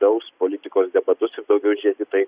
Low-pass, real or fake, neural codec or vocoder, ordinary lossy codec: 3.6 kHz; real; none; Opus, 64 kbps